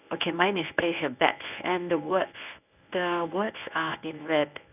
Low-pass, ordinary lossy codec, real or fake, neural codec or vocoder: 3.6 kHz; none; fake; codec, 24 kHz, 0.9 kbps, WavTokenizer, medium speech release version 1